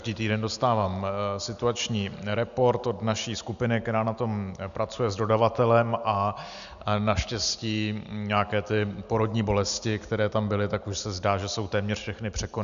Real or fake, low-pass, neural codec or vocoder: real; 7.2 kHz; none